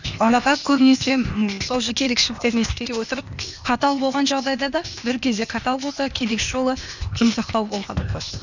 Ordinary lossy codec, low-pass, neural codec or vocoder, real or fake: none; 7.2 kHz; codec, 16 kHz, 0.8 kbps, ZipCodec; fake